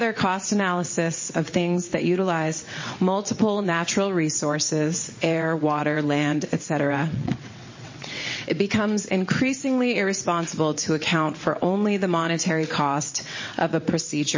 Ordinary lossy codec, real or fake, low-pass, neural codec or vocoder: MP3, 32 kbps; fake; 7.2 kHz; vocoder, 22.05 kHz, 80 mel bands, WaveNeXt